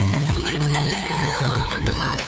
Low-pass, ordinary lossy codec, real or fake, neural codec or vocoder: none; none; fake; codec, 16 kHz, 2 kbps, FunCodec, trained on LibriTTS, 25 frames a second